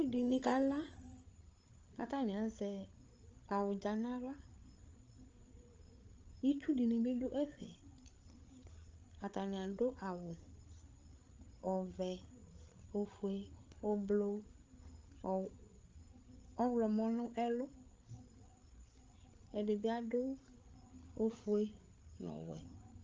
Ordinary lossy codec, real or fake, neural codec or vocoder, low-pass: Opus, 24 kbps; fake; codec, 16 kHz, 8 kbps, FreqCodec, larger model; 7.2 kHz